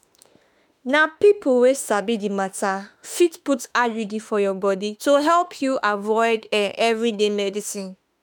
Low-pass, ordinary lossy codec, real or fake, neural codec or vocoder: none; none; fake; autoencoder, 48 kHz, 32 numbers a frame, DAC-VAE, trained on Japanese speech